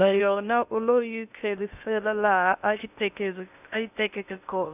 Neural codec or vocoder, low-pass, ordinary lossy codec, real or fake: codec, 16 kHz in and 24 kHz out, 0.6 kbps, FocalCodec, streaming, 2048 codes; 3.6 kHz; none; fake